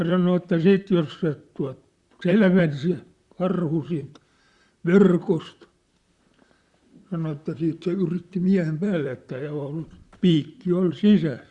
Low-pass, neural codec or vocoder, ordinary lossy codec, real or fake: 10.8 kHz; vocoder, 44.1 kHz, 128 mel bands, Pupu-Vocoder; Opus, 64 kbps; fake